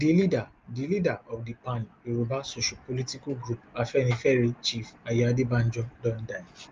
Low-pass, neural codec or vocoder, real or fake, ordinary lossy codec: 7.2 kHz; none; real; Opus, 32 kbps